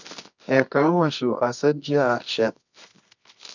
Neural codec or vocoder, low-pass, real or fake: codec, 24 kHz, 0.9 kbps, WavTokenizer, medium music audio release; 7.2 kHz; fake